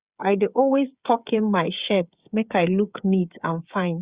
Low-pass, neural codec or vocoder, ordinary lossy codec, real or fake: 3.6 kHz; codec, 16 kHz, 8 kbps, FreqCodec, smaller model; Opus, 64 kbps; fake